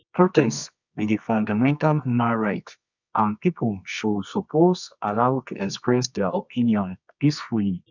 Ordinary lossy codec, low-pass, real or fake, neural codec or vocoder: none; 7.2 kHz; fake; codec, 24 kHz, 0.9 kbps, WavTokenizer, medium music audio release